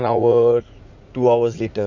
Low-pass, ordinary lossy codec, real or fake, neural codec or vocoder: 7.2 kHz; none; fake; vocoder, 44.1 kHz, 80 mel bands, Vocos